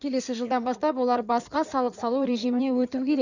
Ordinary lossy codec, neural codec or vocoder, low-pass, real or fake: none; codec, 16 kHz in and 24 kHz out, 2.2 kbps, FireRedTTS-2 codec; 7.2 kHz; fake